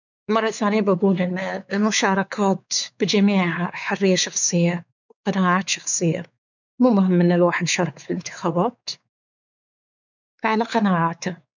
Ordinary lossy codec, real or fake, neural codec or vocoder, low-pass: none; fake; codec, 16 kHz, 4 kbps, X-Codec, WavLM features, trained on Multilingual LibriSpeech; 7.2 kHz